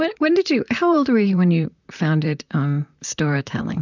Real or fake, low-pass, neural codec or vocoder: fake; 7.2 kHz; vocoder, 44.1 kHz, 128 mel bands, Pupu-Vocoder